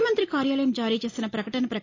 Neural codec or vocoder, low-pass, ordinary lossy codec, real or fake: none; 7.2 kHz; AAC, 32 kbps; real